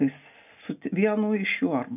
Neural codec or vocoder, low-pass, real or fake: none; 3.6 kHz; real